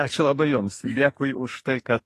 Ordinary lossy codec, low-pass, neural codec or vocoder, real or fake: AAC, 48 kbps; 14.4 kHz; codec, 44.1 kHz, 2.6 kbps, SNAC; fake